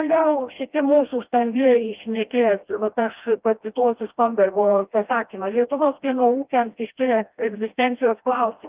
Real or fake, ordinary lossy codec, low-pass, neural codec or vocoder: fake; Opus, 32 kbps; 3.6 kHz; codec, 16 kHz, 1 kbps, FreqCodec, smaller model